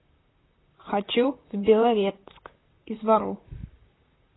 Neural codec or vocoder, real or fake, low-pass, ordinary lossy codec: vocoder, 44.1 kHz, 128 mel bands, Pupu-Vocoder; fake; 7.2 kHz; AAC, 16 kbps